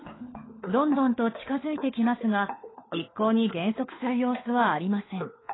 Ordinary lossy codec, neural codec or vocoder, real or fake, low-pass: AAC, 16 kbps; codec, 16 kHz, 4 kbps, FunCodec, trained on LibriTTS, 50 frames a second; fake; 7.2 kHz